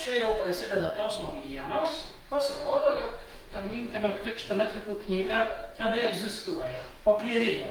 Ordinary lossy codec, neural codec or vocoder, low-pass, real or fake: Opus, 32 kbps; codec, 44.1 kHz, 2.6 kbps, DAC; 19.8 kHz; fake